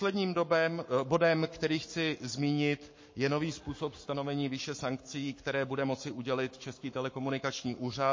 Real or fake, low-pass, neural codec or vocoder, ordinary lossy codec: fake; 7.2 kHz; codec, 44.1 kHz, 7.8 kbps, Pupu-Codec; MP3, 32 kbps